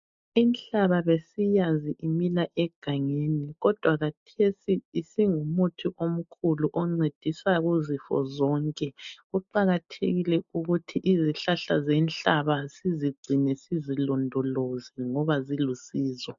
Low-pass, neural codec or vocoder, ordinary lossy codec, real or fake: 7.2 kHz; none; MP3, 48 kbps; real